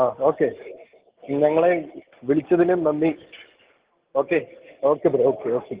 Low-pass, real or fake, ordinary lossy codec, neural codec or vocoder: 3.6 kHz; real; Opus, 16 kbps; none